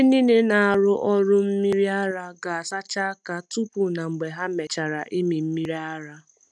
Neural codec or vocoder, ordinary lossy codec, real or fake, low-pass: none; none; real; none